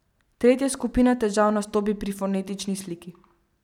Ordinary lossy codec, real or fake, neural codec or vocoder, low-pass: none; real; none; 19.8 kHz